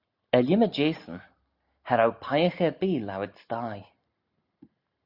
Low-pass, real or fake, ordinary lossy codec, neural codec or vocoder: 5.4 kHz; real; Opus, 64 kbps; none